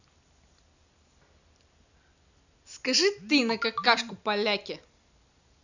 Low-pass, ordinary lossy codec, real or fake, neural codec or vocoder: 7.2 kHz; none; real; none